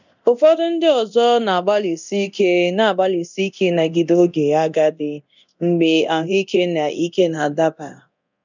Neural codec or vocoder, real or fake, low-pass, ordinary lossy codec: codec, 24 kHz, 0.9 kbps, DualCodec; fake; 7.2 kHz; none